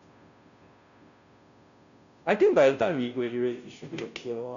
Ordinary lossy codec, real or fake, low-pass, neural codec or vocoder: none; fake; 7.2 kHz; codec, 16 kHz, 0.5 kbps, FunCodec, trained on Chinese and English, 25 frames a second